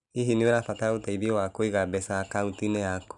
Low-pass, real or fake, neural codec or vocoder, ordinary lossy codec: 10.8 kHz; real; none; none